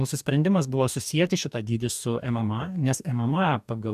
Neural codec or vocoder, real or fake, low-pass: codec, 44.1 kHz, 2.6 kbps, DAC; fake; 14.4 kHz